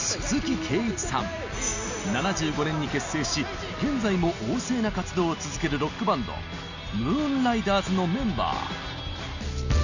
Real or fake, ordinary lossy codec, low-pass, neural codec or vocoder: real; Opus, 64 kbps; 7.2 kHz; none